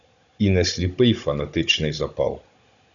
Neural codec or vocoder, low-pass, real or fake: codec, 16 kHz, 16 kbps, FunCodec, trained on Chinese and English, 50 frames a second; 7.2 kHz; fake